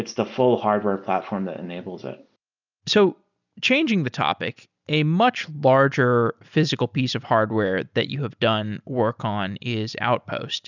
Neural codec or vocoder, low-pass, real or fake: none; 7.2 kHz; real